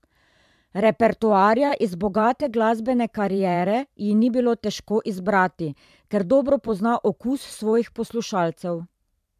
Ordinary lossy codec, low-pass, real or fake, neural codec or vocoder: MP3, 96 kbps; 14.4 kHz; fake; vocoder, 44.1 kHz, 128 mel bands every 512 samples, BigVGAN v2